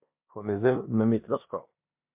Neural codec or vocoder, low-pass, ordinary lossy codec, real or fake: codec, 16 kHz in and 24 kHz out, 0.9 kbps, LongCat-Audio-Codec, four codebook decoder; 3.6 kHz; MP3, 32 kbps; fake